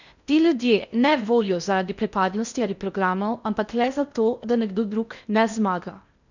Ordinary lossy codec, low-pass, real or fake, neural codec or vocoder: none; 7.2 kHz; fake; codec, 16 kHz in and 24 kHz out, 0.6 kbps, FocalCodec, streaming, 4096 codes